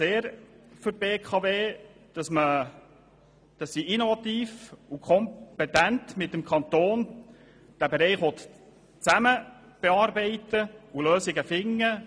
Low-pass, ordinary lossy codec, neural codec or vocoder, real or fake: none; none; none; real